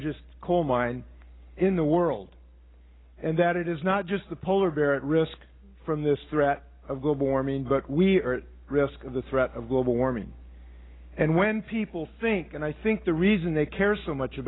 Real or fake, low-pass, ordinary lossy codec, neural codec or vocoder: real; 7.2 kHz; AAC, 16 kbps; none